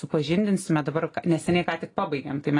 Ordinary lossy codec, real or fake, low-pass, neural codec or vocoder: AAC, 32 kbps; real; 10.8 kHz; none